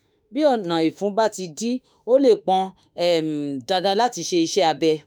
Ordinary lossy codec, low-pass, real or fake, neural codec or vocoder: none; none; fake; autoencoder, 48 kHz, 32 numbers a frame, DAC-VAE, trained on Japanese speech